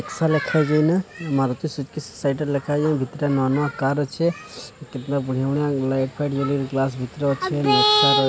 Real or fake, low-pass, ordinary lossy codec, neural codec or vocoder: real; none; none; none